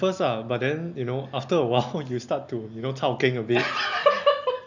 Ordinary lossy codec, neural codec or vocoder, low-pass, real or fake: none; none; 7.2 kHz; real